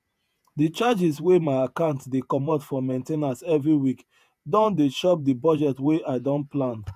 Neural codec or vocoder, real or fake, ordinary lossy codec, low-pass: vocoder, 48 kHz, 128 mel bands, Vocos; fake; none; 14.4 kHz